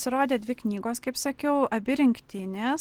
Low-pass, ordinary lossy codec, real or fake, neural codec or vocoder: 19.8 kHz; Opus, 16 kbps; real; none